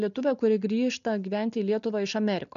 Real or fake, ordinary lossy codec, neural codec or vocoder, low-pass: real; MP3, 64 kbps; none; 7.2 kHz